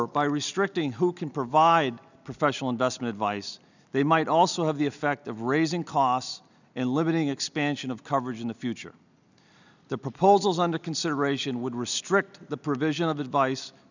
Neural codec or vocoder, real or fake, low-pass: none; real; 7.2 kHz